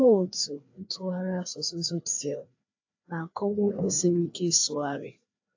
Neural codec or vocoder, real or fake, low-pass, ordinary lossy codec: codec, 16 kHz, 2 kbps, FreqCodec, larger model; fake; 7.2 kHz; AAC, 48 kbps